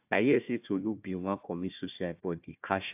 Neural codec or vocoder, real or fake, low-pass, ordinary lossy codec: codec, 16 kHz, 1 kbps, FunCodec, trained on Chinese and English, 50 frames a second; fake; 3.6 kHz; AAC, 32 kbps